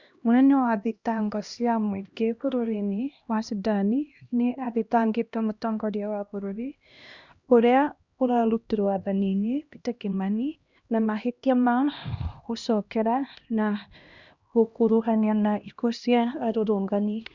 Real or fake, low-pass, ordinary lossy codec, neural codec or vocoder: fake; 7.2 kHz; Opus, 64 kbps; codec, 16 kHz, 1 kbps, X-Codec, HuBERT features, trained on LibriSpeech